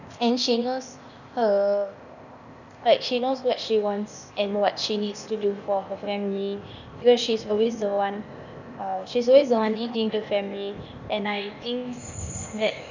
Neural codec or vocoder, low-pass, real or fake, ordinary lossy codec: codec, 16 kHz, 0.8 kbps, ZipCodec; 7.2 kHz; fake; none